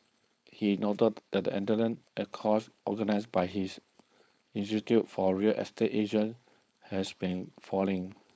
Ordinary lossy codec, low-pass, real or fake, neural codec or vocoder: none; none; fake; codec, 16 kHz, 4.8 kbps, FACodec